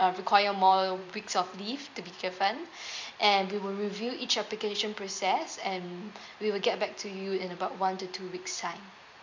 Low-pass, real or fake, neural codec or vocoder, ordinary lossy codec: 7.2 kHz; fake; vocoder, 44.1 kHz, 128 mel bands every 256 samples, BigVGAN v2; MP3, 64 kbps